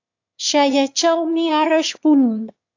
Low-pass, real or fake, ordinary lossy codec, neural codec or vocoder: 7.2 kHz; fake; AAC, 48 kbps; autoencoder, 22.05 kHz, a latent of 192 numbers a frame, VITS, trained on one speaker